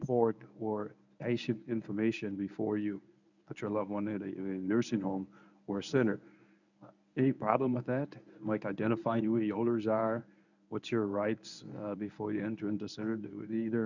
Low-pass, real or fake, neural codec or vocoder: 7.2 kHz; fake; codec, 24 kHz, 0.9 kbps, WavTokenizer, medium speech release version 1